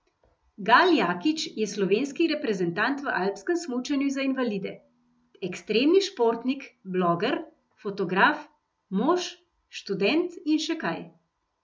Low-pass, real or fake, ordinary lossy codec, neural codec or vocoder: none; real; none; none